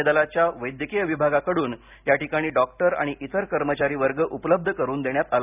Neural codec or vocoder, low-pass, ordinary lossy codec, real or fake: none; 3.6 kHz; none; real